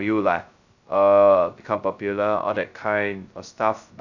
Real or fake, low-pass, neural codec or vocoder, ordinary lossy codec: fake; 7.2 kHz; codec, 16 kHz, 0.2 kbps, FocalCodec; none